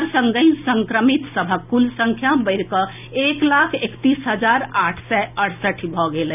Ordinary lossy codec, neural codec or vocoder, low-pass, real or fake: none; none; 3.6 kHz; real